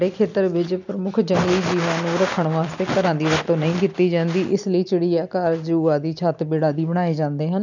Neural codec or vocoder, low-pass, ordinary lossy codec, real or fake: none; 7.2 kHz; none; real